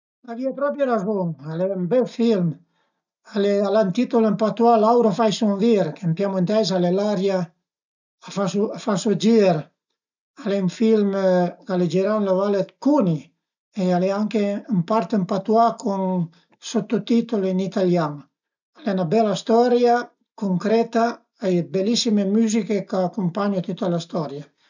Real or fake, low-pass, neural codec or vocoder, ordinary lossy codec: real; 7.2 kHz; none; none